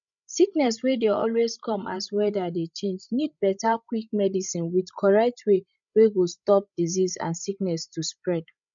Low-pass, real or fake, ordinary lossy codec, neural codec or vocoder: 7.2 kHz; fake; none; codec, 16 kHz, 16 kbps, FreqCodec, larger model